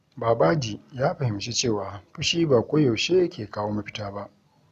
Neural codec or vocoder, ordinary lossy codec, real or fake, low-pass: none; none; real; 14.4 kHz